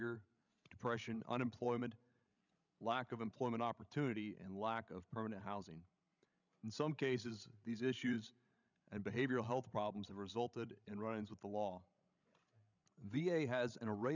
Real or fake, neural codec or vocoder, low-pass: fake; codec, 16 kHz, 16 kbps, FreqCodec, larger model; 7.2 kHz